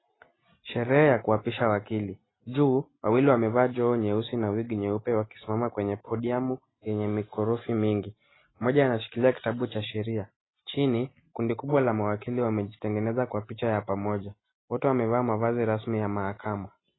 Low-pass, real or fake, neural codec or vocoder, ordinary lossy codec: 7.2 kHz; real; none; AAC, 16 kbps